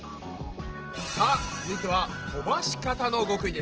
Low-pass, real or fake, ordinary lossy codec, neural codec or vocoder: 7.2 kHz; real; Opus, 16 kbps; none